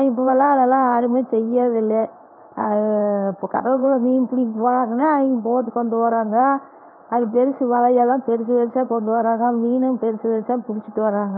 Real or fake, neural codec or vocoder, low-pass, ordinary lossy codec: fake; codec, 16 kHz in and 24 kHz out, 1 kbps, XY-Tokenizer; 5.4 kHz; none